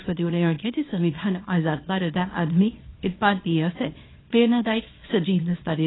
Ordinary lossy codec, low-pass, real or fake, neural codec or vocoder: AAC, 16 kbps; 7.2 kHz; fake; codec, 24 kHz, 0.9 kbps, WavTokenizer, small release